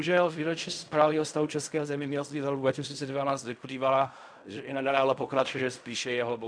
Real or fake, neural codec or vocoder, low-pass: fake; codec, 16 kHz in and 24 kHz out, 0.4 kbps, LongCat-Audio-Codec, fine tuned four codebook decoder; 9.9 kHz